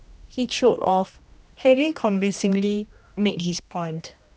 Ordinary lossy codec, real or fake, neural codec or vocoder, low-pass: none; fake; codec, 16 kHz, 1 kbps, X-Codec, HuBERT features, trained on general audio; none